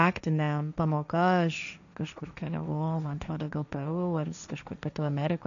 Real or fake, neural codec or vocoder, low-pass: fake; codec, 16 kHz, 1.1 kbps, Voila-Tokenizer; 7.2 kHz